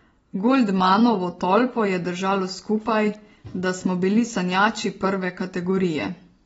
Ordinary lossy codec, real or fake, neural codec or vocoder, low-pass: AAC, 24 kbps; real; none; 10.8 kHz